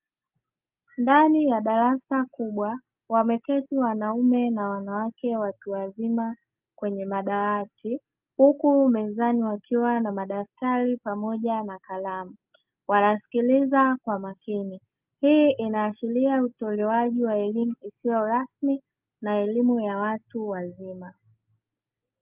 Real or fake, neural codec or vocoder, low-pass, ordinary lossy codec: real; none; 3.6 kHz; Opus, 24 kbps